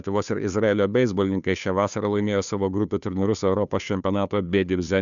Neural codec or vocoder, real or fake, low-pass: codec, 16 kHz, 2 kbps, FunCodec, trained on Chinese and English, 25 frames a second; fake; 7.2 kHz